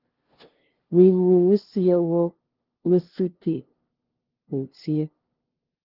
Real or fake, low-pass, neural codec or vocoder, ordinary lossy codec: fake; 5.4 kHz; codec, 16 kHz, 0.5 kbps, FunCodec, trained on LibriTTS, 25 frames a second; Opus, 16 kbps